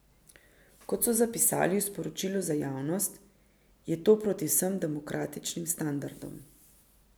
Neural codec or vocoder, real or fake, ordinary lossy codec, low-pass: none; real; none; none